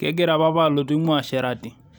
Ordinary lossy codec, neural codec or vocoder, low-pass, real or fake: none; none; none; real